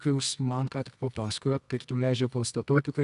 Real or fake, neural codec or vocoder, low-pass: fake; codec, 24 kHz, 0.9 kbps, WavTokenizer, medium music audio release; 10.8 kHz